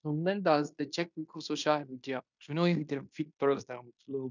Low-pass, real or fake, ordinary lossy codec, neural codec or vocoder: 7.2 kHz; fake; none; codec, 16 kHz in and 24 kHz out, 0.9 kbps, LongCat-Audio-Codec, fine tuned four codebook decoder